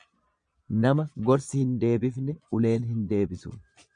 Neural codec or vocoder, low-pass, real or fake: vocoder, 22.05 kHz, 80 mel bands, Vocos; 9.9 kHz; fake